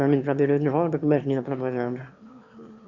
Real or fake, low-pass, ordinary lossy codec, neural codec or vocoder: fake; 7.2 kHz; Opus, 64 kbps; autoencoder, 22.05 kHz, a latent of 192 numbers a frame, VITS, trained on one speaker